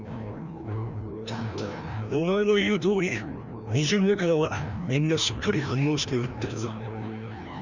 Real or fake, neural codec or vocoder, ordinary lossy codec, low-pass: fake; codec, 16 kHz, 1 kbps, FreqCodec, larger model; none; 7.2 kHz